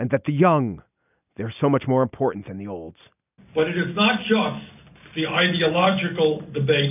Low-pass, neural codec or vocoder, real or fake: 3.6 kHz; none; real